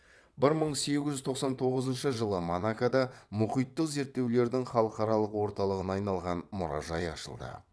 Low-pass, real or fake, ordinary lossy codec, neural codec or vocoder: none; fake; none; vocoder, 22.05 kHz, 80 mel bands, WaveNeXt